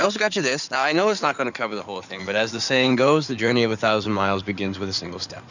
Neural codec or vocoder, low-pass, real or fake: codec, 16 kHz in and 24 kHz out, 2.2 kbps, FireRedTTS-2 codec; 7.2 kHz; fake